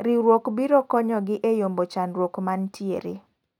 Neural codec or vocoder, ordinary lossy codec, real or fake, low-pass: none; none; real; 19.8 kHz